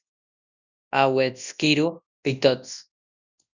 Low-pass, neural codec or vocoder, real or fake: 7.2 kHz; codec, 24 kHz, 0.9 kbps, WavTokenizer, large speech release; fake